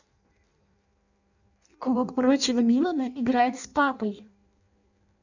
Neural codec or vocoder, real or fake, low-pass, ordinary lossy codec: codec, 16 kHz in and 24 kHz out, 0.6 kbps, FireRedTTS-2 codec; fake; 7.2 kHz; none